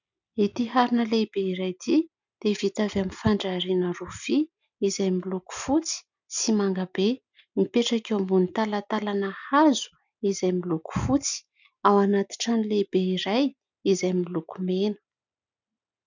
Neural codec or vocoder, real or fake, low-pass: none; real; 7.2 kHz